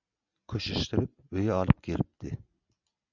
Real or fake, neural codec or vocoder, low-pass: real; none; 7.2 kHz